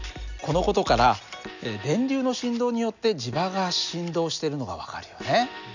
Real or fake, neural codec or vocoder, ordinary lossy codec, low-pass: real; none; none; 7.2 kHz